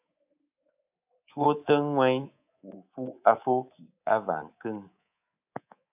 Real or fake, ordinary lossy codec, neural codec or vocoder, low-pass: fake; AAC, 32 kbps; codec, 24 kHz, 3.1 kbps, DualCodec; 3.6 kHz